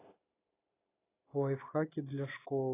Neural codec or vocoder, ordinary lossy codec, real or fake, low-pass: none; AAC, 16 kbps; real; 3.6 kHz